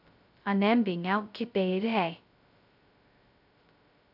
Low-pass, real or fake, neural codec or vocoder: 5.4 kHz; fake; codec, 16 kHz, 0.2 kbps, FocalCodec